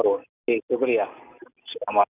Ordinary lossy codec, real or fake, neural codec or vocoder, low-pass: none; real; none; 3.6 kHz